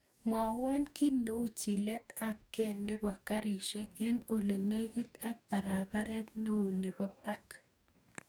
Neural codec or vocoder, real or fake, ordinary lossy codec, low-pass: codec, 44.1 kHz, 2.6 kbps, DAC; fake; none; none